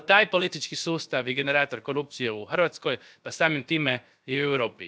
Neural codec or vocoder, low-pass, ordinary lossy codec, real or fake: codec, 16 kHz, about 1 kbps, DyCAST, with the encoder's durations; none; none; fake